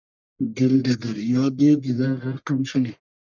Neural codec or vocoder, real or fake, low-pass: codec, 44.1 kHz, 1.7 kbps, Pupu-Codec; fake; 7.2 kHz